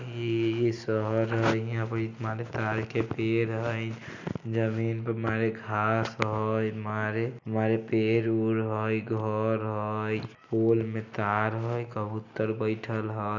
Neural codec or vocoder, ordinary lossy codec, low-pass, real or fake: none; none; 7.2 kHz; real